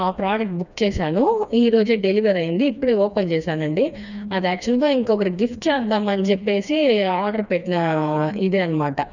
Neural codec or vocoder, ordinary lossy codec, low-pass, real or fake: codec, 16 kHz, 2 kbps, FreqCodec, smaller model; none; 7.2 kHz; fake